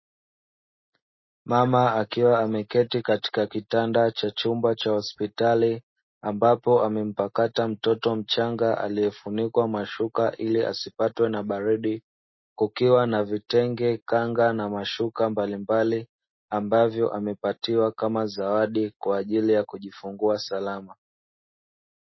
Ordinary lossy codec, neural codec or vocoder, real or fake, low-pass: MP3, 24 kbps; none; real; 7.2 kHz